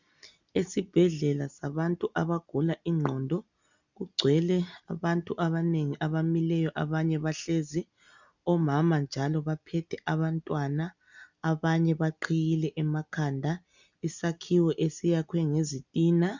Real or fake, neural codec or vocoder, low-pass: real; none; 7.2 kHz